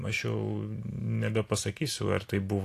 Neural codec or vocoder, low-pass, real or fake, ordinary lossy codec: none; 14.4 kHz; real; AAC, 64 kbps